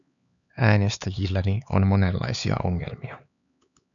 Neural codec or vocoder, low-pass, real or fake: codec, 16 kHz, 4 kbps, X-Codec, HuBERT features, trained on LibriSpeech; 7.2 kHz; fake